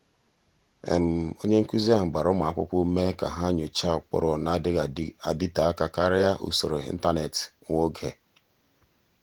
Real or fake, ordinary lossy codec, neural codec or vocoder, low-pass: fake; Opus, 24 kbps; vocoder, 48 kHz, 128 mel bands, Vocos; 19.8 kHz